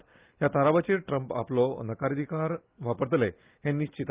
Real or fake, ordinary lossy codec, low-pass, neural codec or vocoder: real; Opus, 24 kbps; 3.6 kHz; none